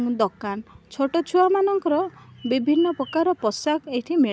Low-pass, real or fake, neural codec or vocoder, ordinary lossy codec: none; real; none; none